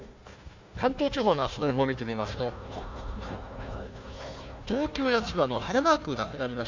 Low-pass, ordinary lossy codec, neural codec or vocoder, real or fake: 7.2 kHz; MP3, 64 kbps; codec, 16 kHz, 1 kbps, FunCodec, trained on Chinese and English, 50 frames a second; fake